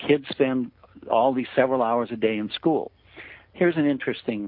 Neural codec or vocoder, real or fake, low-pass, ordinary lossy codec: none; real; 5.4 kHz; MP3, 32 kbps